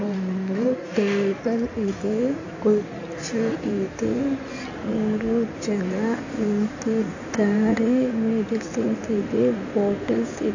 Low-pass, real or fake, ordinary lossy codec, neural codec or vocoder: 7.2 kHz; fake; AAC, 48 kbps; codec, 16 kHz in and 24 kHz out, 2.2 kbps, FireRedTTS-2 codec